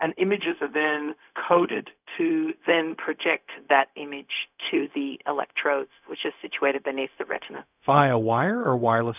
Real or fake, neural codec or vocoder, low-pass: fake; codec, 16 kHz, 0.4 kbps, LongCat-Audio-Codec; 3.6 kHz